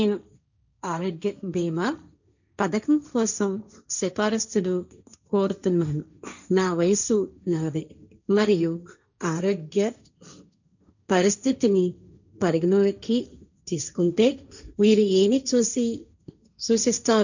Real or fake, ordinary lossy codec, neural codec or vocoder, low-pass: fake; none; codec, 16 kHz, 1.1 kbps, Voila-Tokenizer; 7.2 kHz